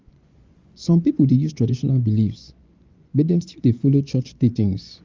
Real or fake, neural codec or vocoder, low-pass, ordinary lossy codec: fake; vocoder, 24 kHz, 100 mel bands, Vocos; 7.2 kHz; Opus, 32 kbps